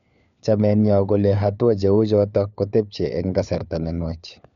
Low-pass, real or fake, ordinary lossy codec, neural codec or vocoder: 7.2 kHz; fake; none; codec, 16 kHz, 4 kbps, FunCodec, trained on LibriTTS, 50 frames a second